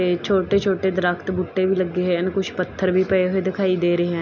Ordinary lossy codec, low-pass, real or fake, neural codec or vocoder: none; 7.2 kHz; real; none